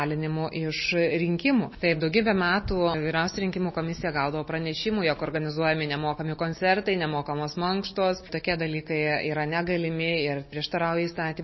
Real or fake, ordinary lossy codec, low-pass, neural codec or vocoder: real; MP3, 24 kbps; 7.2 kHz; none